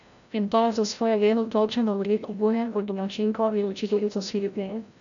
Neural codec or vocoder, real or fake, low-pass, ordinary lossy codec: codec, 16 kHz, 0.5 kbps, FreqCodec, larger model; fake; 7.2 kHz; none